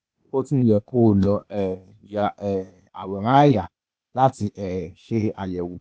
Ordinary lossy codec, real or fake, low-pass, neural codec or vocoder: none; fake; none; codec, 16 kHz, 0.8 kbps, ZipCodec